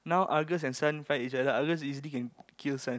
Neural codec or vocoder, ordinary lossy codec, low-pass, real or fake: none; none; none; real